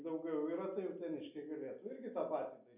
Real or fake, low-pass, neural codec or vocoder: real; 3.6 kHz; none